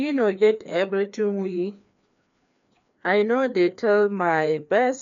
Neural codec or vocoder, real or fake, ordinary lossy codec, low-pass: codec, 16 kHz, 2 kbps, FreqCodec, larger model; fake; MP3, 64 kbps; 7.2 kHz